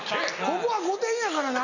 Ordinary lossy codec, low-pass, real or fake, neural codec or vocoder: none; 7.2 kHz; real; none